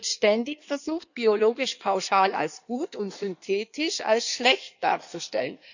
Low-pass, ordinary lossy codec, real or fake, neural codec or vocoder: 7.2 kHz; none; fake; codec, 16 kHz in and 24 kHz out, 1.1 kbps, FireRedTTS-2 codec